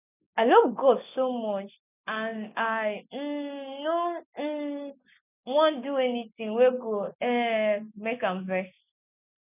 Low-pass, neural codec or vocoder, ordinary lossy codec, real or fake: 3.6 kHz; none; none; real